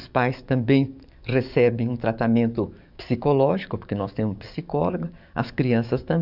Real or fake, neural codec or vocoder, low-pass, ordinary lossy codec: fake; codec, 44.1 kHz, 7.8 kbps, DAC; 5.4 kHz; none